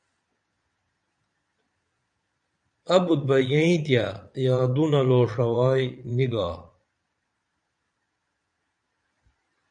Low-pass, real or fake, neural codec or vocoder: 9.9 kHz; fake; vocoder, 22.05 kHz, 80 mel bands, Vocos